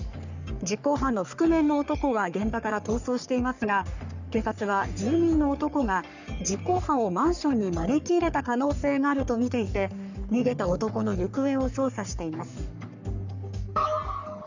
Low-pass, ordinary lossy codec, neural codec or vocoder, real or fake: 7.2 kHz; none; codec, 44.1 kHz, 3.4 kbps, Pupu-Codec; fake